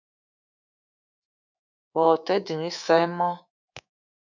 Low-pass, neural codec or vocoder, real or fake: 7.2 kHz; codec, 24 kHz, 3.1 kbps, DualCodec; fake